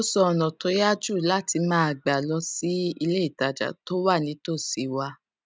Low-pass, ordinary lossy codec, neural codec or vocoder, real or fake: none; none; none; real